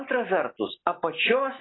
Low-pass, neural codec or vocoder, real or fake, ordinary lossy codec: 7.2 kHz; none; real; AAC, 16 kbps